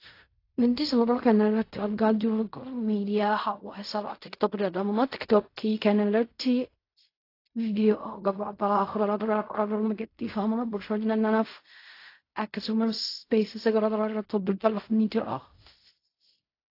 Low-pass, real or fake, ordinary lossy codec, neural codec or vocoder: 5.4 kHz; fake; AAC, 32 kbps; codec, 16 kHz in and 24 kHz out, 0.4 kbps, LongCat-Audio-Codec, fine tuned four codebook decoder